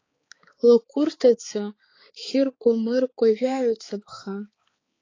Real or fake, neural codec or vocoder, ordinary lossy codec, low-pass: fake; codec, 16 kHz, 4 kbps, X-Codec, HuBERT features, trained on balanced general audio; AAC, 32 kbps; 7.2 kHz